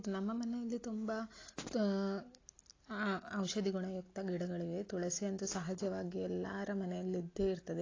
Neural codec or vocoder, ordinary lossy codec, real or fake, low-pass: none; AAC, 32 kbps; real; 7.2 kHz